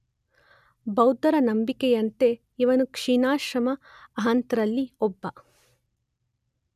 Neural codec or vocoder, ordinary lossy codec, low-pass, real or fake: none; none; 14.4 kHz; real